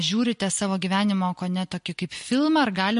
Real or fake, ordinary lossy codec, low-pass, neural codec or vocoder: real; MP3, 48 kbps; 10.8 kHz; none